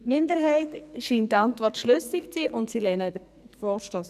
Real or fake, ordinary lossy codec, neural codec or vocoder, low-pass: fake; none; codec, 44.1 kHz, 2.6 kbps, SNAC; 14.4 kHz